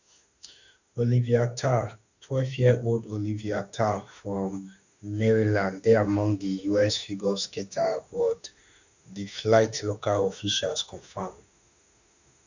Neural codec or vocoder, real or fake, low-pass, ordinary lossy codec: autoencoder, 48 kHz, 32 numbers a frame, DAC-VAE, trained on Japanese speech; fake; 7.2 kHz; none